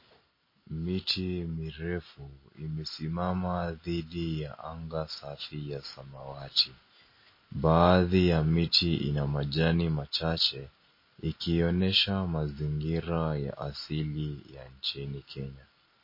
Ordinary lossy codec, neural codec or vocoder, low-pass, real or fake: MP3, 24 kbps; none; 5.4 kHz; real